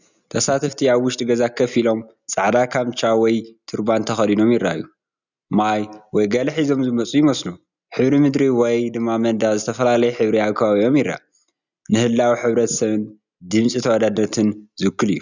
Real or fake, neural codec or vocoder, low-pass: real; none; 7.2 kHz